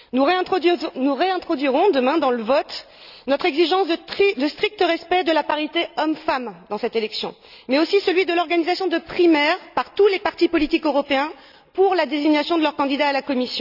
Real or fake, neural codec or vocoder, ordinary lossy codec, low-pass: real; none; none; 5.4 kHz